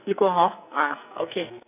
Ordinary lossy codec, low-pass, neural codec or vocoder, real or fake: none; 3.6 kHz; codec, 44.1 kHz, 2.6 kbps, SNAC; fake